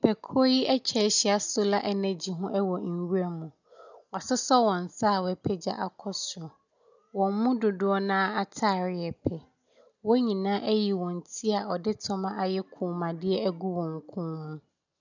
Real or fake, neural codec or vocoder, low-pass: real; none; 7.2 kHz